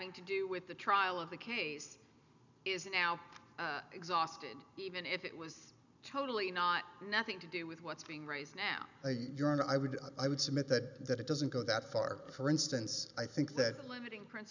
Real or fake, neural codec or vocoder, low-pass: real; none; 7.2 kHz